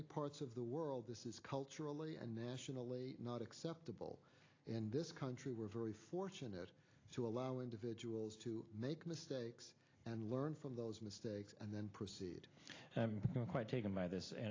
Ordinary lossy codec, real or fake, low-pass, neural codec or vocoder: AAC, 32 kbps; real; 7.2 kHz; none